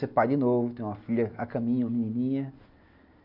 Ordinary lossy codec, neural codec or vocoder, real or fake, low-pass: none; none; real; 5.4 kHz